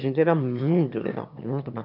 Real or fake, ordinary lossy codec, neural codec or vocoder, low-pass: fake; none; autoencoder, 22.05 kHz, a latent of 192 numbers a frame, VITS, trained on one speaker; 5.4 kHz